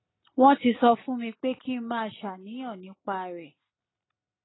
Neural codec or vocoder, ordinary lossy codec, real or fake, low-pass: none; AAC, 16 kbps; real; 7.2 kHz